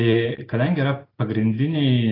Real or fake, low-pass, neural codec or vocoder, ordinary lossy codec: real; 5.4 kHz; none; AAC, 24 kbps